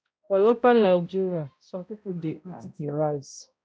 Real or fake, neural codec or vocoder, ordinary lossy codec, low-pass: fake; codec, 16 kHz, 0.5 kbps, X-Codec, HuBERT features, trained on balanced general audio; none; none